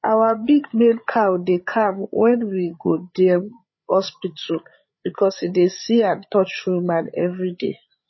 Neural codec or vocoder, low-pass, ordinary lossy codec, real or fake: codec, 16 kHz, 8 kbps, FreqCodec, larger model; 7.2 kHz; MP3, 24 kbps; fake